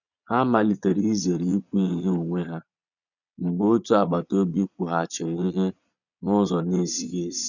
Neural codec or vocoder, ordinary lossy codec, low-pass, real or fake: vocoder, 22.05 kHz, 80 mel bands, Vocos; none; 7.2 kHz; fake